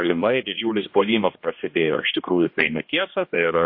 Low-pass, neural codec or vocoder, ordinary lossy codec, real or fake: 5.4 kHz; codec, 16 kHz, 1 kbps, X-Codec, HuBERT features, trained on general audio; MP3, 32 kbps; fake